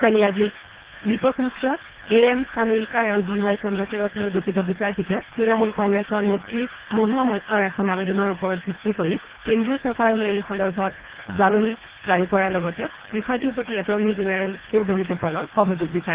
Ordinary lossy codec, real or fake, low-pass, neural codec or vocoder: Opus, 16 kbps; fake; 3.6 kHz; codec, 24 kHz, 1.5 kbps, HILCodec